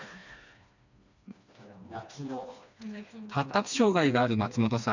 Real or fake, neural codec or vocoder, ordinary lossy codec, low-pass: fake; codec, 16 kHz, 2 kbps, FreqCodec, smaller model; none; 7.2 kHz